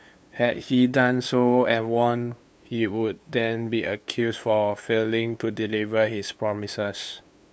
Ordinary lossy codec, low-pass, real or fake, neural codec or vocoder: none; none; fake; codec, 16 kHz, 2 kbps, FunCodec, trained on LibriTTS, 25 frames a second